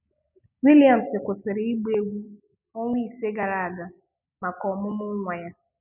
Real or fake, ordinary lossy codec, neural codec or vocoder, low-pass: real; none; none; 3.6 kHz